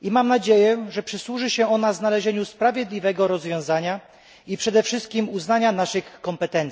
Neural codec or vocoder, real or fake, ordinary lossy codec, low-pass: none; real; none; none